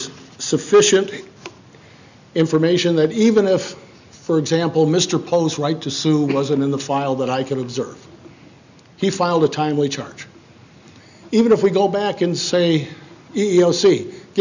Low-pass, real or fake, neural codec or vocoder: 7.2 kHz; real; none